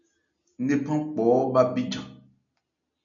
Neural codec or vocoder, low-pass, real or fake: none; 7.2 kHz; real